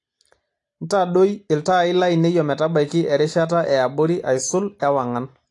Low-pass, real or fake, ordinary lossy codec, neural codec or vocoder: 10.8 kHz; real; AAC, 48 kbps; none